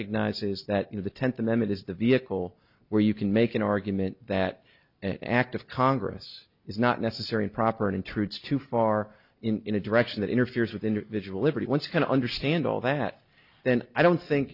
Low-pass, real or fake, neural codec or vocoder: 5.4 kHz; real; none